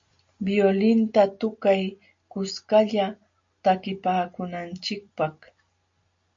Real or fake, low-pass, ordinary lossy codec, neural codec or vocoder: real; 7.2 kHz; MP3, 48 kbps; none